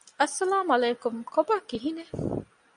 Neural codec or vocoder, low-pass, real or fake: none; 9.9 kHz; real